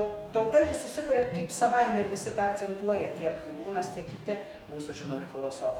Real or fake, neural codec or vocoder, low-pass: fake; codec, 44.1 kHz, 2.6 kbps, DAC; 19.8 kHz